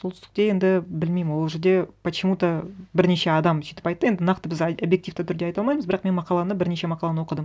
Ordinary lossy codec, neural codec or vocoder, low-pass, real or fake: none; none; none; real